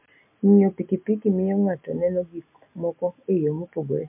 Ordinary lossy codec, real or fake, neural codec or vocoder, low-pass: MP3, 32 kbps; real; none; 3.6 kHz